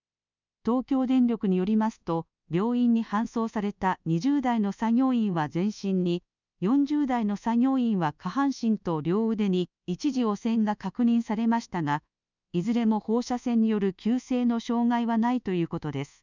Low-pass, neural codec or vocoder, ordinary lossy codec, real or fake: 7.2 kHz; codec, 24 kHz, 1.2 kbps, DualCodec; none; fake